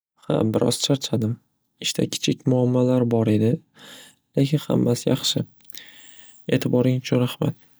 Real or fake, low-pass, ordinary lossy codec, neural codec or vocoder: real; none; none; none